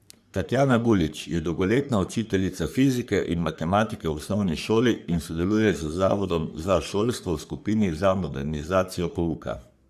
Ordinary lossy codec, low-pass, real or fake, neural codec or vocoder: none; 14.4 kHz; fake; codec, 44.1 kHz, 3.4 kbps, Pupu-Codec